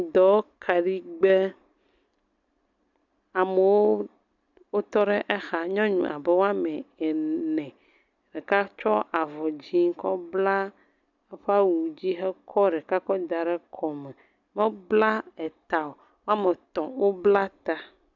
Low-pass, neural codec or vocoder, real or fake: 7.2 kHz; none; real